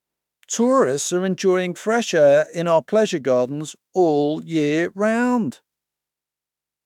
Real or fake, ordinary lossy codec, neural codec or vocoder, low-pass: fake; none; autoencoder, 48 kHz, 32 numbers a frame, DAC-VAE, trained on Japanese speech; 19.8 kHz